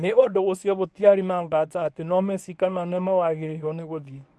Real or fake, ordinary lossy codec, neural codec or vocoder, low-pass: fake; none; codec, 24 kHz, 0.9 kbps, WavTokenizer, medium speech release version 1; none